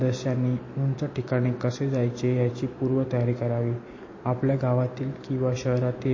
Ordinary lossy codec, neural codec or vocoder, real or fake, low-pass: MP3, 32 kbps; none; real; 7.2 kHz